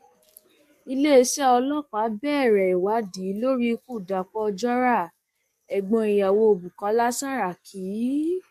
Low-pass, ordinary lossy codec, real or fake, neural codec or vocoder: 14.4 kHz; MP3, 64 kbps; fake; codec, 44.1 kHz, 7.8 kbps, DAC